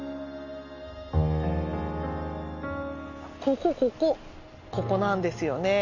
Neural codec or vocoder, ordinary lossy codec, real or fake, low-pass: none; none; real; 7.2 kHz